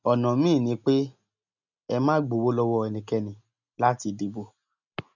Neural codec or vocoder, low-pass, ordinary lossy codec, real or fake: none; 7.2 kHz; none; real